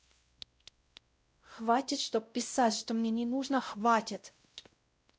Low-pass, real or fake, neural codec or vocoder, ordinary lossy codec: none; fake; codec, 16 kHz, 0.5 kbps, X-Codec, WavLM features, trained on Multilingual LibriSpeech; none